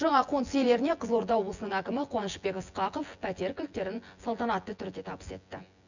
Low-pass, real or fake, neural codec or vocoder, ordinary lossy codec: 7.2 kHz; fake; vocoder, 24 kHz, 100 mel bands, Vocos; none